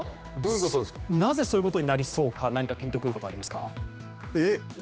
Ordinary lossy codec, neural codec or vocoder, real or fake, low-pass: none; codec, 16 kHz, 2 kbps, X-Codec, HuBERT features, trained on general audio; fake; none